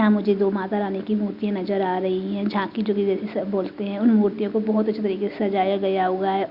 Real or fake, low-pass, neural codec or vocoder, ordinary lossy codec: real; 5.4 kHz; none; Opus, 64 kbps